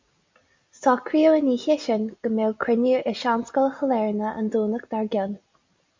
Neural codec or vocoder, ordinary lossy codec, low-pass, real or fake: none; MP3, 64 kbps; 7.2 kHz; real